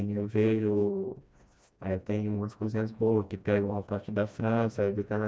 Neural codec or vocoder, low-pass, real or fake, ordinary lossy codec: codec, 16 kHz, 1 kbps, FreqCodec, smaller model; none; fake; none